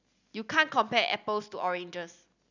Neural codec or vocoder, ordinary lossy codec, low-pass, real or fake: none; none; 7.2 kHz; real